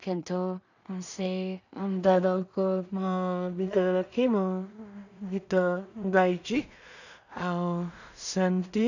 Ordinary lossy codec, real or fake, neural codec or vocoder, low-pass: none; fake; codec, 16 kHz in and 24 kHz out, 0.4 kbps, LongCat-Audio-Codec, two codebook decoder; 7.2 kHz